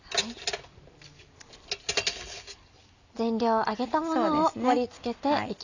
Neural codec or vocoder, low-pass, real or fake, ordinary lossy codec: none; 7.2 kHz; real; none